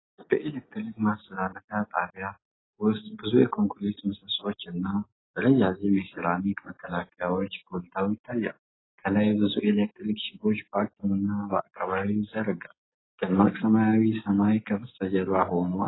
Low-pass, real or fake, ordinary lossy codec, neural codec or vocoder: 7.2 kHz; real; AAC, 16 kbps; none